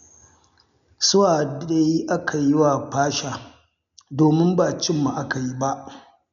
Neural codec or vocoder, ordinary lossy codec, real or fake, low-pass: none; none; real; 7.2 kHz